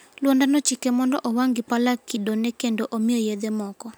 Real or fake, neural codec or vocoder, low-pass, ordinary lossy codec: real; none; none; none